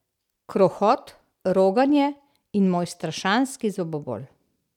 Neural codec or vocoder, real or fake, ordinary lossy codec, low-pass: none; real; none; 19.8 kHz